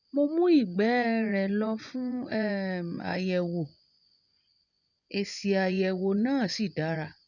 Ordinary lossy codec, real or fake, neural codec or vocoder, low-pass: none; fake; vocoder, 24 kHz, 100 mel bands, Vocos; 7.2 kHz